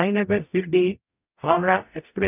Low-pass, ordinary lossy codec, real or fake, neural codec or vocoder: 3.6 kHz; none; fake; codec, 44.1 kHz, 0.9 kbps, DAC